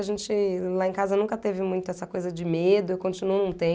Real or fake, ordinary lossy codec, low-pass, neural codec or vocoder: real; none; none; none